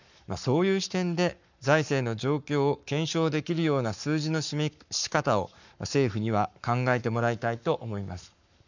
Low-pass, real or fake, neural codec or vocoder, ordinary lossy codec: 7.2 kHz; fake; codec, 44.1 kHz, 7.8 kbps, Pupu-Codec; none